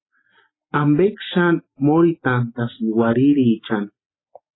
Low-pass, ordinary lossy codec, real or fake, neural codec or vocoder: 7.2 kHz; AAC, 16 kbps; real; none